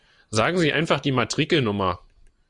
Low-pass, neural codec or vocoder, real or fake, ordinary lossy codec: 10.8 kHz; none; real; MP3, 64 kbps